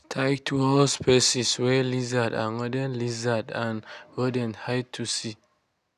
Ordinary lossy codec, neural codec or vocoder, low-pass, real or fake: none; none; 14.4 kHz; real